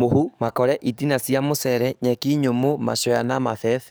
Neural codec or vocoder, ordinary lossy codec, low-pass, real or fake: codec, 44.1 kHz, 7.8 kbps, DAC; none; none; fake